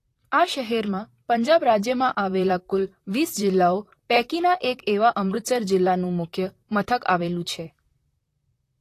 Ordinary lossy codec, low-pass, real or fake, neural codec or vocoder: AAC, 48 kbps; 14.4 kHz; fake; vocoder, 44.1 kHz, 128 mel bands, Pupu-Vocoder